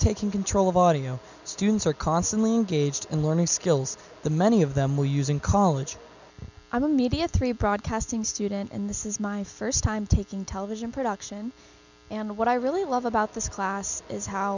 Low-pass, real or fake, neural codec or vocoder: 7.2 kHz; real; none